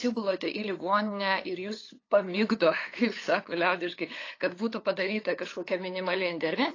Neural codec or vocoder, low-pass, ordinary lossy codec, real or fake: codec, 16 kHz, 8 kbps, FunCodec, trained on LibriTTS, 25 frames a second; 7.2 kHz; AAC, 32 kbps; fake